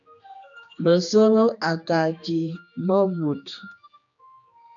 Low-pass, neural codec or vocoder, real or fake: 7.2 kHz; codec, 16 kHz, 2 kbps, X-Codec, HuBERT features, trained on general audio; fake